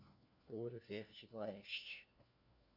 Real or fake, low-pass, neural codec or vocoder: fake; 5.4 kHz; codec, 16 kHz, 1 kbps, FunCodec, trained on LibriTTS, 50 frames a second